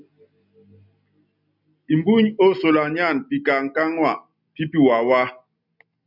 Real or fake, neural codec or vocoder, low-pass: real; none; 5.4 kHz